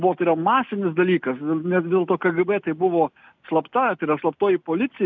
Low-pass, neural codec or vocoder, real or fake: 7.2 kHz; none; real